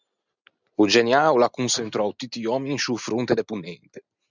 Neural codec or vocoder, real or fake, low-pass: none; real; 7.2 kHz